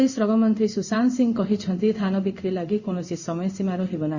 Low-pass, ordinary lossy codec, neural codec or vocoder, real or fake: 7.2 kHz; Opus, 64 kbps; codec, 16 kHz in and 24 kHz out, 1 kbps, XY-Tokenizer; fake